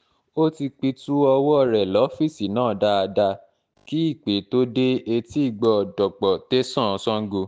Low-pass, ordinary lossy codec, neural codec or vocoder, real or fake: none; none; none; real